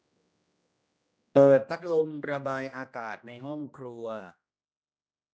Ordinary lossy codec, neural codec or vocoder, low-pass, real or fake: none; codec, 16 kHz, 1 kbps, X-Codec, HuBERT features, trained on general audio; none; fake